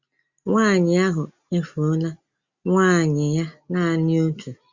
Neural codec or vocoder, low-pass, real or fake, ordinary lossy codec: none; 7.2 kHz; real; Opus, 64 kbps